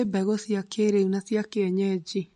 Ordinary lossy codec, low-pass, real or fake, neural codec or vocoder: MP3, 48 kbps; 14.4 kHz; real; none